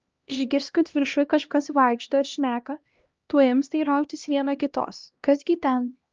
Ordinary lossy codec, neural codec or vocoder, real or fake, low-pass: Opus, 24 kbps; codec, 16 kHz, 1 kbps, X-Codec, HuBERT features, trained on LibriSpeech; fake; 7.2 kHz